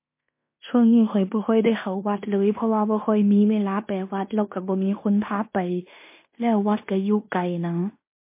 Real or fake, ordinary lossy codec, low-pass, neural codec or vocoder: fake; MP3, 24 kbps; 3.6 kHz; codec, 16 kHz in and 24 kHz out, 0.9 kbps, LongCat-Audio-Codec, fine tuned four codebook decoder